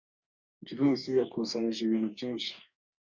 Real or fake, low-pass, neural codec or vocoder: fake; 7.2 kHz; codec, 44.1 kHz, 2.6 kbps, DAC